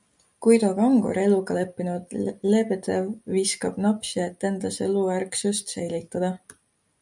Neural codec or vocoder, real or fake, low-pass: none; real; 10.8 kHz